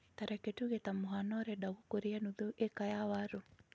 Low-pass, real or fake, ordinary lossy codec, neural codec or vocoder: none; real; none; none